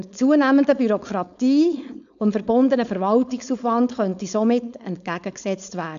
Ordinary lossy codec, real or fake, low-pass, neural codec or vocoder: AAC, 96 kbps; fake; 7.2 kHz; codec, 16 kHz, 4.8 kbps, FACodec